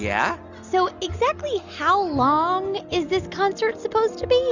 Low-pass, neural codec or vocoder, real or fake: 7.2 kHz; none; real